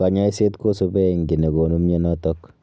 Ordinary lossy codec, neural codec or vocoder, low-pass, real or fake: none; none; none; real